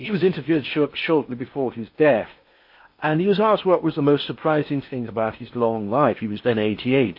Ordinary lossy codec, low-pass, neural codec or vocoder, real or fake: MP3, 32 kbps; 5.4 kHz; codec, 16 kHz in and 24 kHz out, 0.8 kbps, FocalCodec, streaming, 65536 codes; fake